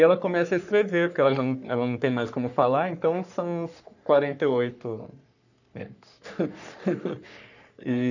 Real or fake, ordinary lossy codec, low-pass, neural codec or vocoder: fake; none; 7.2 kHz; codec, 44.1 kHz, 3.4 kbps, Pupu-Codec